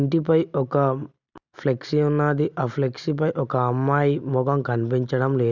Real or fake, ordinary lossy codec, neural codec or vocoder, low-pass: real; none; none; 7.2 kHz